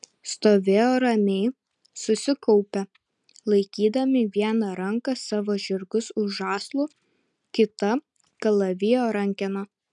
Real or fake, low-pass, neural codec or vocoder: real; 10.8 kHz; none